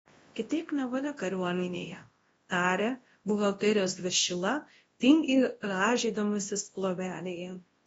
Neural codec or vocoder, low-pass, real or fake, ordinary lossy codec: codec, 24 kHz, 0.9 kbps, WavTokenizer, large speech release; 10.8 kHz; fake; AAC, 24 kbps